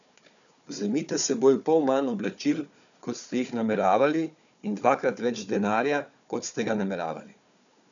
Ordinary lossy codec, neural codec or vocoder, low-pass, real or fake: none; codec, 16 kHz, 4 kbps, FunCodec, trained on Chinese and English, 50 frames a second; 7.2 kHz; fake